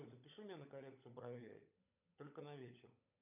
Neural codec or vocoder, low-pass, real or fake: codec, 16 kHz, 16 kbps, FunCodec, trained on LibriTTS, 50 frames a second; 3.6 kHz; fake